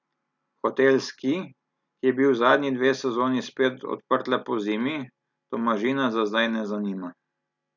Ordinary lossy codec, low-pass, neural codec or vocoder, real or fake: none; 7.2 kHz; none; real